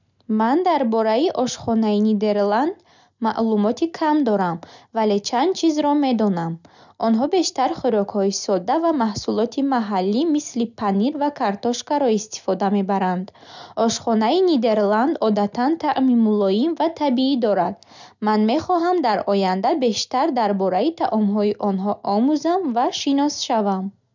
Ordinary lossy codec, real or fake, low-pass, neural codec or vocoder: none; real; 7.2 kHz; none